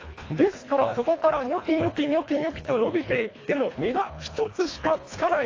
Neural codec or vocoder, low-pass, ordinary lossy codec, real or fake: codec, 24 kHz, 1.5 kbps, HILCodec; 7.2 kHz; AAC, 32 kbps; fake